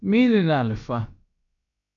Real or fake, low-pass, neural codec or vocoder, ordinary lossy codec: fake; 7.2 kHz; codec, 16 kHz, about 1 kbps, DyCAST, with the encoder's durations; MP3, 64 kbps